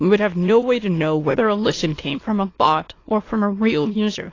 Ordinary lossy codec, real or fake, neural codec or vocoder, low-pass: AAC, 32 kbps; fake; autoencoder, 22.05 kHz, a latent of 192 numbers a frame, VITS, trained on many speakers; 7.2 kHz